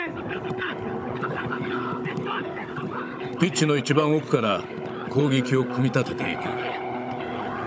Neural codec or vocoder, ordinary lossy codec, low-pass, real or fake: codec, 16 kHz, 16 kbps, FunCodec, trained on Chinese and English, 50 frames a second; none; none; fake